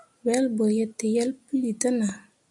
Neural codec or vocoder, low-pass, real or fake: none; 10.8 kHz; real